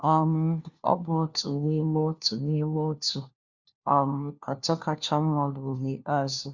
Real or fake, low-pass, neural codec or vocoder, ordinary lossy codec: fake; 7.2 kHz; codec, 16 kHz, 1 kbps, FunCodec, trained on LibriTTS, 50 frames a second; Opus, 64 kbps